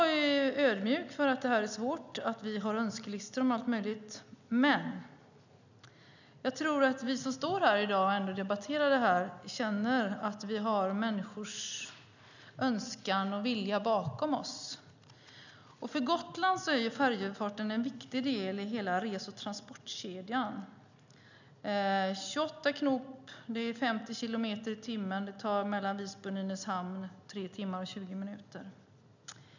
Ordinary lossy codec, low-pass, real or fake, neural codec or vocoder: none; 7.2 kHz; real; none